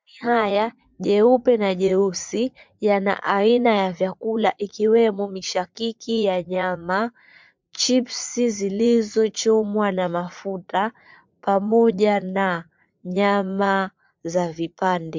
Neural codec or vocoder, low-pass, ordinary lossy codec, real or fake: vocoder, 44.1 kHz, 80 mel bands, Vocos; 7.2 kHz; MP3, 64 kbps; fake